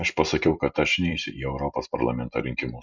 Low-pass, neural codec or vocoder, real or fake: 7.2 kHz; vocoder, 44.1 kHz, 128 mel bands every 256 samples, BigVGAN v2; fake